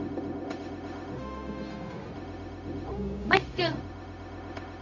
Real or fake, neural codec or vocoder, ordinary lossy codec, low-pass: fake; codec, 16 kHz, 0.4 kbps, LongCat-Audio-Codec; none; 7.2 kHz